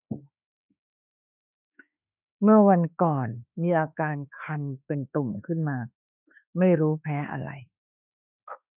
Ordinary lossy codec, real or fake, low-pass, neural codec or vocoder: none; fake; 3.6 kHz; autoencoder, 48 kHz, 32 numbers a frame, DAC-VAE, trained on Japanese speech